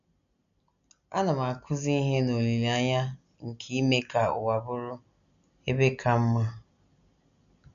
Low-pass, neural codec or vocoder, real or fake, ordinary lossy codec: 7.2 kHz; none; real; none